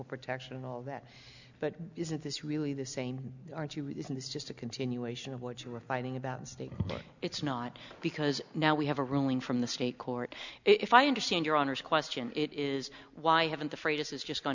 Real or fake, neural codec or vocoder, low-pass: real; none; 7.2 kHz